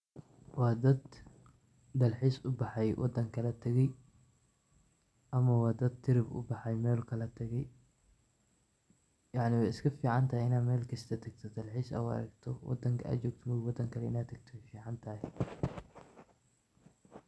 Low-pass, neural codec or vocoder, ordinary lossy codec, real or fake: none; none; none; real